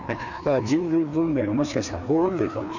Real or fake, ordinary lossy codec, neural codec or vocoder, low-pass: fake; none; codec, 16 kHz, 2 kbps, FreqCodec, larger model; 7.2 kHz